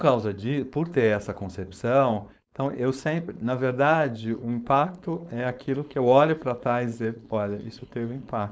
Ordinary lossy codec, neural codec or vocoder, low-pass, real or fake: none; codec, 16 kHz, 4.8 kbps, FACodec; none; fake